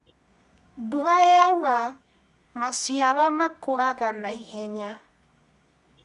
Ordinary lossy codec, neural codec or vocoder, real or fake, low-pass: none; codec, 24 kHz, 0.9 kbps, WavTokenizer, medium music audio release; fake; 10.8 kHz